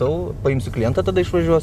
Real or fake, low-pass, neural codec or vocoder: real; 14.4 kHz; none